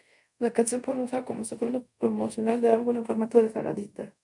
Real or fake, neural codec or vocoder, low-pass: fake; codec, 24 kHz, 0.5 kbps, DualCodec; 10.8 kHz